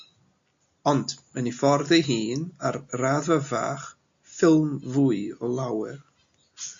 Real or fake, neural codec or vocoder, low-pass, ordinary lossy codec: real; none; 7.2 kHz; MP3, 48 kbps